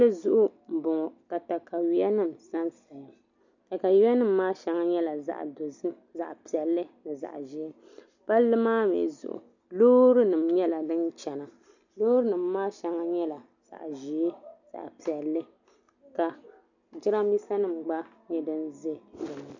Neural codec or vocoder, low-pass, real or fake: none; 7.2 kHz; real